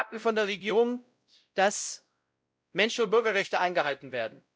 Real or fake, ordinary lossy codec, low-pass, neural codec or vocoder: fake; none; none; codec, 16 kHz, 0.5 kbps, X-Codec, WavLM features, trained on Multilingual LibriSpeech